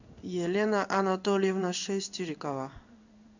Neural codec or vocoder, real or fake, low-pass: codec, 16 kHz in and 24 kHz out, 1 kbps, XY-Tokenizer; fake; 7.2 kHz